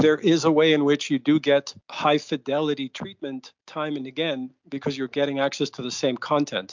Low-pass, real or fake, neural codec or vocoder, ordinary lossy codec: 7.2 kHz; real; none; MP3, 64 kbps